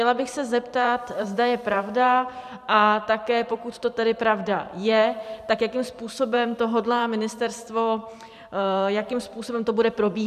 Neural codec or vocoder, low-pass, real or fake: vocoder, 44.1 kHz, 128 mel bands every 512 samples, BigVGAN v2; 14.4 kHz; fake